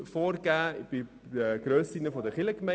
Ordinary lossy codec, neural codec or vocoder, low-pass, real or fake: none; none; none; real